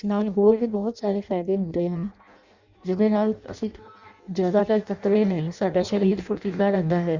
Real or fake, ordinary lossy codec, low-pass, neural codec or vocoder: fake; Opus, 64 kbps; 7.2 kHz; codec, 16 kHz in and 24 kHz out, 0.6 kbps, FireRedTTS-2 codec